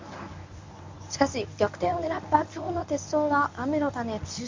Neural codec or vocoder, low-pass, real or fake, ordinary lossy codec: codec, 24 kHz, 0.9 kbps, WavTokenizer, medium speech release version 1; 7.2 kHz; fake; MP3, 64 kbps